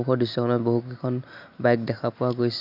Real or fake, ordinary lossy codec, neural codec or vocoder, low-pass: real; AAC, 48 kbps; none; 5.4 kHz